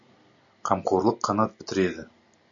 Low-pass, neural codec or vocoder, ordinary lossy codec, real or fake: 7.2 kHz; none; MP3, 64 kbps; real